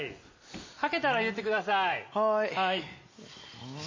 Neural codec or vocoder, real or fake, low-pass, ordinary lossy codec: none; real; 7.2 kHz; MP3, 32 kbps